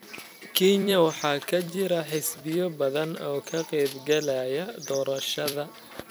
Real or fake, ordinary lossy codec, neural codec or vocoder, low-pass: fake; none; vocoder, 44.1 kHz, 128 mel bands every 256 samples, BigVGAN v2; none